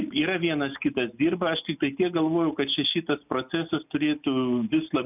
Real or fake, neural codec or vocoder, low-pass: real; none; 3.6 kHz